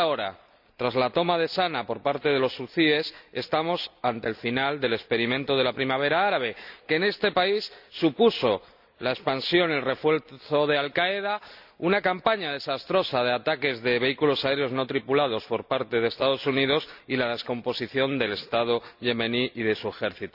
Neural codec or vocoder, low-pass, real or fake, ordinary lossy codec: none; 5.4 kHz; real; none